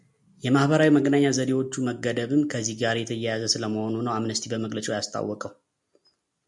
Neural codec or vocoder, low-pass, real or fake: none; 10.8 kHz; real